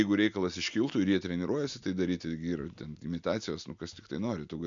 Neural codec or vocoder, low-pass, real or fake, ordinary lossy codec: none; 7.2 kHz; real; MP3, 64 kbps